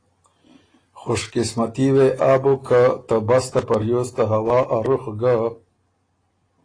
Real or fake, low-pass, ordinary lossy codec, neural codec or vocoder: real; 9.9 kHz; AAC, 32 kbps; none